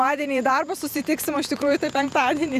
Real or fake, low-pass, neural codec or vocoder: fake; 14.4 kHz; vocoder, 48 kHz, 128 mel bands, Vocos